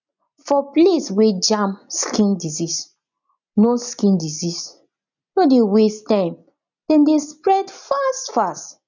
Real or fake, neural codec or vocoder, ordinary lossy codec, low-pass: real; none; none; 7.2 kHz